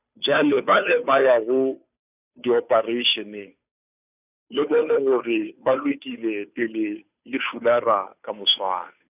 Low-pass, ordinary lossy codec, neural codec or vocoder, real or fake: 3.6 kHz; none; codec, 16 kHz, 2 kbps, FunCodec, trained on Chinese and English, 25 frames a second; fake